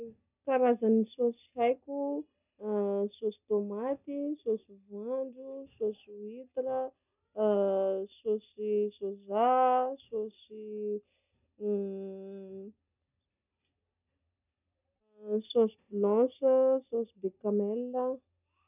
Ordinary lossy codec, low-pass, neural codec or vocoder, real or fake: none; 3.6 kHz; none; real